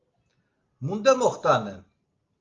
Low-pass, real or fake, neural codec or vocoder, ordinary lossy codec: 7.2 kHz; real; none; Opus, 32 kbps